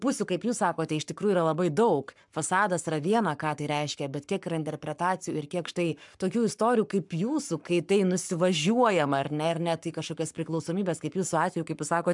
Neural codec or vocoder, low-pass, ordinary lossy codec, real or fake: codec, 44.1 kHz, 7.8 kbps, Pupu-Codec; 10.8 kHz; MP3, 96 kbps; fake